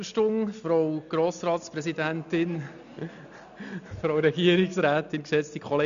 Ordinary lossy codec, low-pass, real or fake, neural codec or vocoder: none; 7.2 kHz; real; none